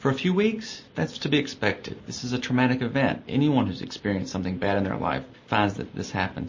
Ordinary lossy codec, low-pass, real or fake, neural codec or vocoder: MP3, 32 kbps; 7.2 kHz; real; none